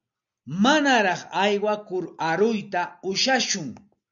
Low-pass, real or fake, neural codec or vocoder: 7.2 kHz; real; none